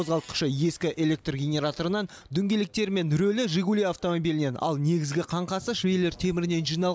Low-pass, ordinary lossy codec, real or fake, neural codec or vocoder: none; none; real; none